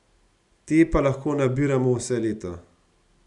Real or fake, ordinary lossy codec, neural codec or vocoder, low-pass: real; none; none; 10.8 kHz